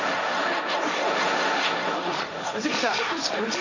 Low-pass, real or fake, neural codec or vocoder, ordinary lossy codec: 7.2 kHz; fake; codec, 16 kHz, 1.1 kbps, Voila-Tokenizer; none